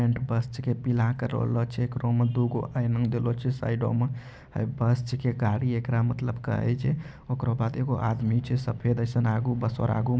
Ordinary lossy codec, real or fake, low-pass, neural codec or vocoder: none; real; none; none